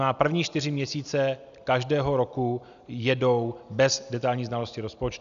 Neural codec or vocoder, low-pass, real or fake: none; 7.2 kHz; real